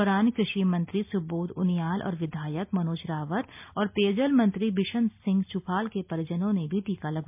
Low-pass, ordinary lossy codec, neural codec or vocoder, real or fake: 3.6 kHz; MP3, 32 kbps; none; real